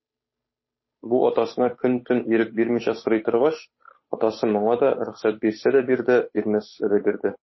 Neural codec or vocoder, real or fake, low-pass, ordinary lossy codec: codec, 16 kHz, 8 kbps, FunCodec, trained on Chinese and English, 25 frames a second; fake; 7.2 kHz; MP3, 24 kbps